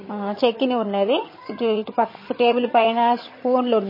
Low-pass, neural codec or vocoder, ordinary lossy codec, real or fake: 5.4 kHz; vocoder, 22.05 kHz, 80 mel bands, HiFi-GAN; MP3, 24 kbps; fake